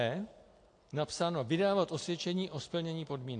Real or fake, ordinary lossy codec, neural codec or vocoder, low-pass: real; AAC, 48 kbps; none; 9.9 kHz